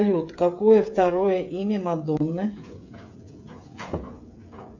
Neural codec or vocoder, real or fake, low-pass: codec, 16 kHz, 8 kbps, FreqCodec, smaller model; fake; 7.2 kHz